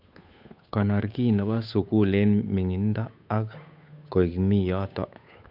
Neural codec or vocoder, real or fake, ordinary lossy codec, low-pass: codec, 16 kHz, 8 kbps, FunCodec, trained on Chinese and English, 25 frames a second; fake; none; 5.4 kHz